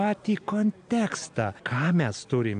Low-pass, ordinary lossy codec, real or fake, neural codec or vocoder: 9.9 kHz; AAC, 64 kbps; real; none